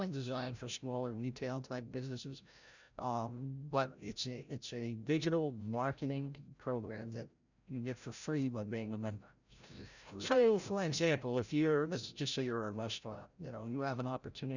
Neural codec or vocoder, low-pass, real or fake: codec, 16 kHz, 0.5 kbps, FreqCodec, larger model; 7.2 kHz; fake